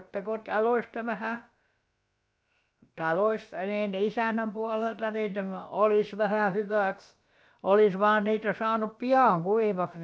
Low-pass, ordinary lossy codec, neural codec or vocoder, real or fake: none; none; codec, 16 kHz, about 1 kbps, DyCAST, with the encoder's durations; fake